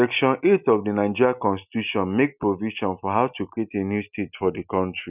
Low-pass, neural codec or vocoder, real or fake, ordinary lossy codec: 3.6 kHz; none; real; none